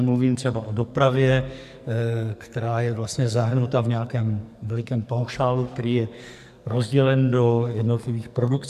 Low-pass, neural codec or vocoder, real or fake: 14.4 kHz; codec, 44.1 kHz, 2.6 kbps, SNAC; fake